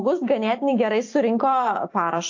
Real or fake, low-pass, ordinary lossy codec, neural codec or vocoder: fake; 7.2 kHz; AAC, 48 kbps; vocoder, 44.1 kHz, 128 mel bands every 256 samples, BigVGAN v2